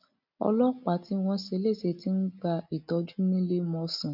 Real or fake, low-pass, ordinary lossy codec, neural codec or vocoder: real; 5.4 kHz; none; none